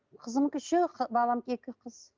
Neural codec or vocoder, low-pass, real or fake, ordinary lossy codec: none; 7.2 kHz; real; Opus, 24 kbps